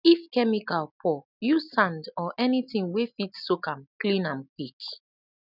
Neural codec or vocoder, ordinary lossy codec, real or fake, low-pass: none; none; real; 5.4 kHz